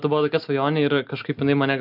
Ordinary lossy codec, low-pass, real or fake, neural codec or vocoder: AAC, 48 kbps; 5.4 kHz; real; none